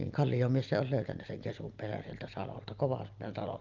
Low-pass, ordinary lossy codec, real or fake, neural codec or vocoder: 7.2 kHz; Opus, 24 kbps; real; none